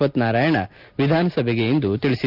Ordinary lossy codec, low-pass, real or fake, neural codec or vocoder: Opus, 24 kbps; 5.4 kHz; real; none